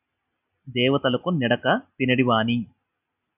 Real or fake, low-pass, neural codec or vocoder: real; 3.6 kHz; none